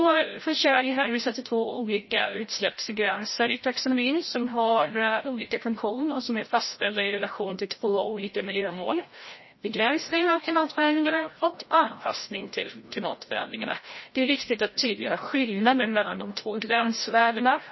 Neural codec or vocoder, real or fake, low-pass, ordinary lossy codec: codec, 16 kHz, 0.5 kbps, FreqCodec, larger model; fake; 7.2 kHz; MP3, 24 kbps